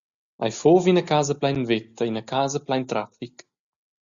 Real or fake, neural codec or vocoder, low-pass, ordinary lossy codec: real; none; 7.2 kHz; Opus, 64 kbps